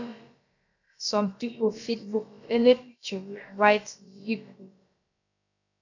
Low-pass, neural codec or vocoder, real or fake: 7.2 kHz; codec, 16 kHz, about 1 kbps, DyCAST, with the encoder's durations; fake